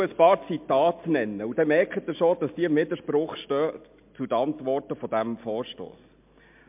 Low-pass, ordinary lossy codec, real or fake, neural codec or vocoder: 3.6 kHz; MP3, 32 kbps; real; none